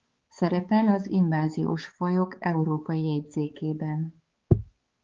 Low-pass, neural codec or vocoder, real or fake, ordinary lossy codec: 7.2 kHz; codec, 16 kHz, 4 kbps, X-Codec, HuBERT features, trained on balanced general audio; fake; Opus, 16 kbps